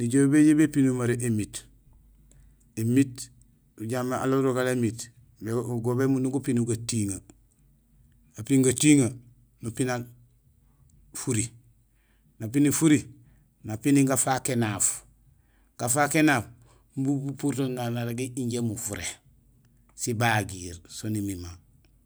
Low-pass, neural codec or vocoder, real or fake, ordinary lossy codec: none; none; real; none